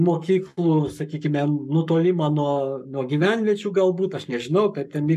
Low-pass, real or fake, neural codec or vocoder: 14.4 kHz; fake; codec, 44.1 kHz, 7.8 kbps, Pupu-Codec